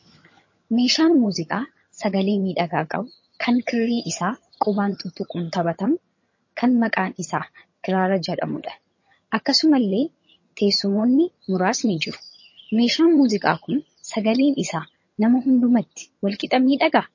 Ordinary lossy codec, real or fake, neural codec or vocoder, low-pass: MP3, 32 kbps; fake; vocoder, 22.05 kHz, 80 mel bands, HiFi-GAN; 7.2 kHz